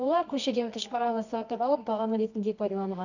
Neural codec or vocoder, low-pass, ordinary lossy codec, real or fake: codec, 24 kHz, 0.9 kbps, WavTokenizer, medium music audio release; 7.2 kHz; none; fake